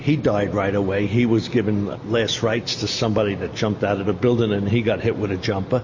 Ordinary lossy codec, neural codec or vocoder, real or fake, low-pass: MP3, 32 kbps; none; real; 7.2 kHz